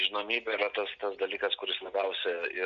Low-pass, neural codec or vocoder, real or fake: 7.2 kHz; none; real